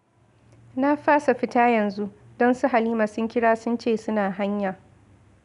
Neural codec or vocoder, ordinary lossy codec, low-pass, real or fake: none; none; 10.8 kHz; real